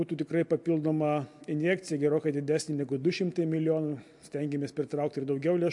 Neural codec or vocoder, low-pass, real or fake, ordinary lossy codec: none; 10.8 kHz; real; MP3, 64 kbps